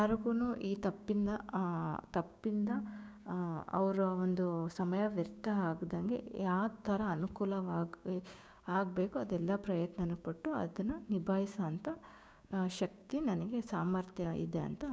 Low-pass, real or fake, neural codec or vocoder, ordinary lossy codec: none; fake; codec, 16 kHz, 6 kbps, DAC; none